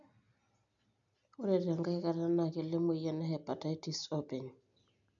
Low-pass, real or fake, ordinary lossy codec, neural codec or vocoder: 7.2 kHz; real; none; none